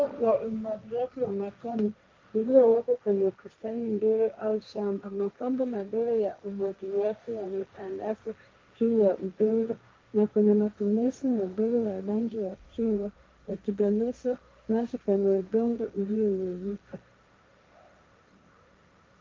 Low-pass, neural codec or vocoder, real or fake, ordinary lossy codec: 7.2 kHz; codec, 16 kHz, 1.1 kbps, Voila-Tokenizer; fake; Opus, 24 kbps